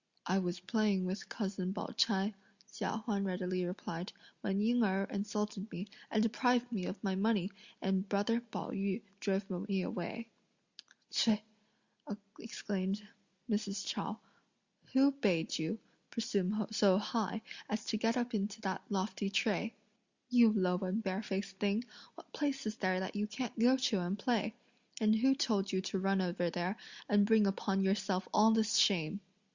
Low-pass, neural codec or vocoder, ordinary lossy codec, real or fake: 7.2 kHz; none; Opus, 64 kbps; real